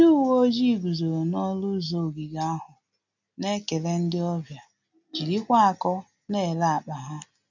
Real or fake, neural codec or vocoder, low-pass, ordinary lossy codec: real; none; 7.2 kHz; none